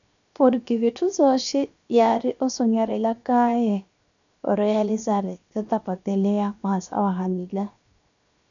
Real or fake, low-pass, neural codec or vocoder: fake; 7.2 kHz; codec, 16 kHz, 0.7 kbps, FocalCodec